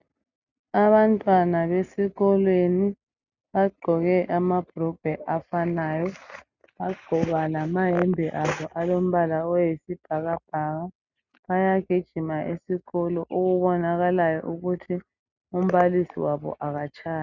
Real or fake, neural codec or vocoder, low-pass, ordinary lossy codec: real; none; 7.2 kHz; Opus, 64 kbps